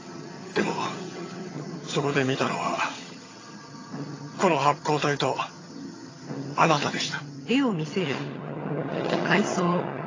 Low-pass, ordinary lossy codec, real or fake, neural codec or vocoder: 7.2 kHz; AAC, 32 kbps; fake; vocoder, 22.05 kHz, 80 mel bands, HiFi-GAN